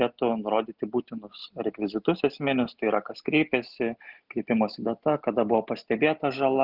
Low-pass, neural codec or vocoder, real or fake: 5.4 kHz; none; real